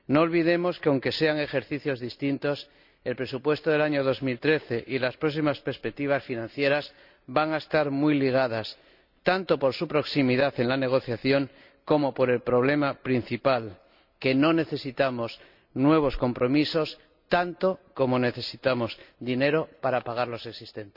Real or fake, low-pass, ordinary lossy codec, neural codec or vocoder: real; 5.4 kHz; none; none